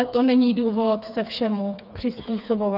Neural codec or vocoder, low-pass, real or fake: codec, 16 kHz, 4 kbps, FreqCodec, smaller model; 5.4 kHz; fake